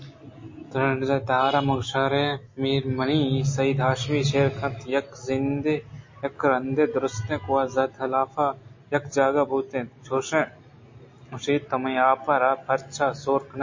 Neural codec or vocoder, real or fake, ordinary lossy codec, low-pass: none; real; MP3, 32 kbps; 7.2 kHz